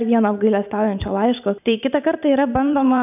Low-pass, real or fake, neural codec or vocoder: 3.6 kHz; fake; vocoder, 44.1 kHz, 128 mel bands every 512 samples, BigVGAN v2